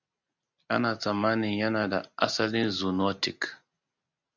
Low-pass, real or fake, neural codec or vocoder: 7.2 kHz; real; none